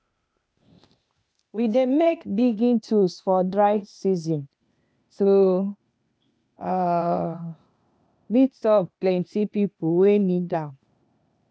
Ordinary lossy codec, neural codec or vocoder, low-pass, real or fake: none; codec, 16 kHz, 0.8 kbps, ZipCodec; none; fake